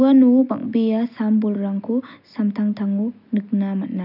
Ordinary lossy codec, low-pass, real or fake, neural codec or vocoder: none; 5.4 kHz; real; none